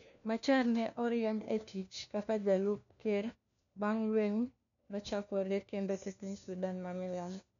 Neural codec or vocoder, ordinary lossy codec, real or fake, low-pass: codec, 16 kHz, 1 kbps, FunCodec, trained on LibriTTS, 50 frames a second; none; fake; 7.2 kHz